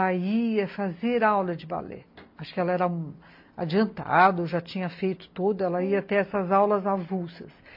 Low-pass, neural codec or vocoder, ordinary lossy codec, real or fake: 5.4 kHz; none; MP3, 32 kbps; real